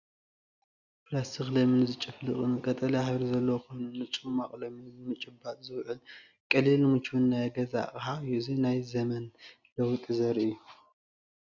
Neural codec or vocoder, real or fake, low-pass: none; real; 7.2 kHz